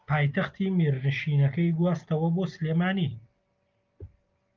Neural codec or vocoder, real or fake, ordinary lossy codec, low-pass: none; real; Opus, 24 kbps; 7.2 kHz